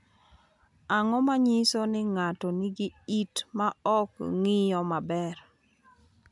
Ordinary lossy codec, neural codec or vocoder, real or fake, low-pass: none; none; real; 10.8 kHz